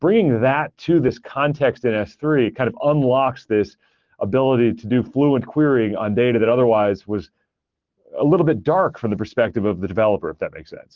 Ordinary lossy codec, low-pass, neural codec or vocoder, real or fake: Opus, 16 kbps; 7.2 kHz; none; real